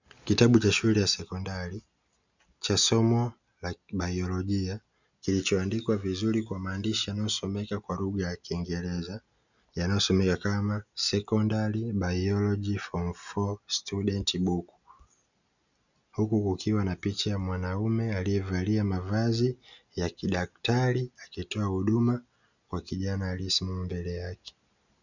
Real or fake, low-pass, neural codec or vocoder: real; 7.2 kHz; none